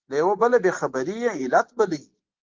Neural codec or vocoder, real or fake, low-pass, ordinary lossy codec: none; real; 7.2 kHz; Opus, 16 kbps